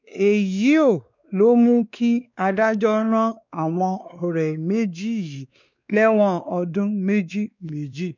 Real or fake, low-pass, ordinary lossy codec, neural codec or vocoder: fake; 7.2 kHz; none; codec, 16 kHz, 2 kbps, X-Codec, WavLM features, trained on Multilingual LibriSpeech